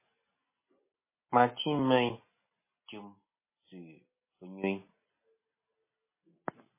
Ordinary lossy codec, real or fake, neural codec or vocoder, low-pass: MP3, 16 kbps; real; none; 3.6 kHz